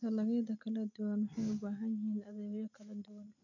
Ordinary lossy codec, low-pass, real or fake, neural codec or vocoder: none; 7.2 kHz; real; none